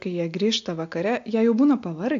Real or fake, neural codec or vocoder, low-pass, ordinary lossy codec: real; none; 7.2 kHz; AAC, 48 kbps